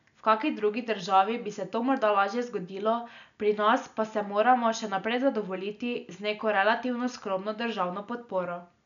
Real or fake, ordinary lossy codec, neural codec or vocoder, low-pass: real; MP3, 96 kbps; none; 7.2 kHz